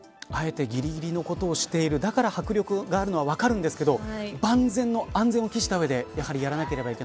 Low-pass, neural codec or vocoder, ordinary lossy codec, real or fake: none; none; none; real